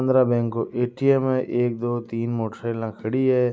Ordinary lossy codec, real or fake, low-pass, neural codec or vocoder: none; real; none; none